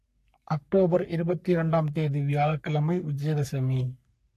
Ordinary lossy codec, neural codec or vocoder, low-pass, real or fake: AAC, 64 kbps; codec, 44.1 kHz, 3.4 kbps, Pupu-Codec; 14.4 kHz; fake